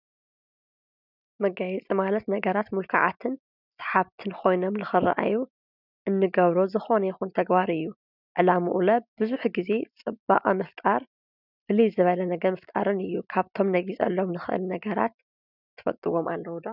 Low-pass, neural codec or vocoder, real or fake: 5.4 kHz; none; real